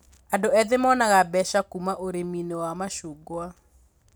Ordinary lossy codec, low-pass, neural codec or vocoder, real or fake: none; none; none; real